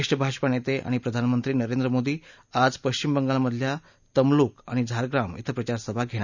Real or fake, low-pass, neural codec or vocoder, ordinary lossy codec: real; 7.2 kHz; none; none